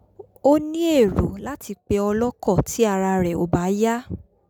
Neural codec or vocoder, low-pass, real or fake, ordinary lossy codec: none; 19.8 kHz; real; none